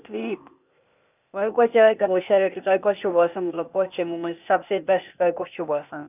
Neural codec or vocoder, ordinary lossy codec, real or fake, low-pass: codec, 16 kHz, 0.8 kbps, ZipCodec; none; fake; 3.6 kHz